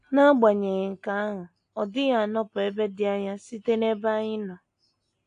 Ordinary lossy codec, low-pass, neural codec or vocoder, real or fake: AAC, 48 kbps; 9.9 kHz; none; real